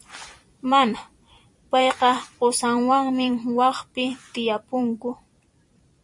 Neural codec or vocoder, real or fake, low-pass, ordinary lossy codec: vocoder, 44.1 kHz, 128 mel bands every 256 samples, BigVGAN v2; fake; 10.8 kHz; MP3, 48 kbps